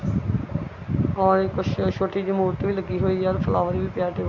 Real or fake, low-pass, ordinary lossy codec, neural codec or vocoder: real; 7.2 kHz; none; none